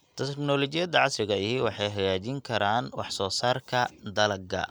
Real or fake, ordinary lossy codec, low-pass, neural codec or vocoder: real; none; none; none